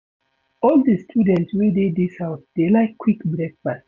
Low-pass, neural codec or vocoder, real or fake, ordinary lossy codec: 7.2 kHz; none; real; none